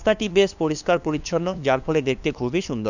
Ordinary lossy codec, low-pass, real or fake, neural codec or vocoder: none; 7.2 kHz; fake; codec, 16 kHz, 2 kbps, FunCodec, trained on LibriTTS, 25 frames a second